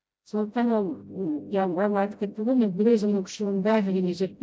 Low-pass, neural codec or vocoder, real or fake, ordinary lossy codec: none; codec, 16 kHz, 0.5 kbps, FreqCodec, smaller model; fake; none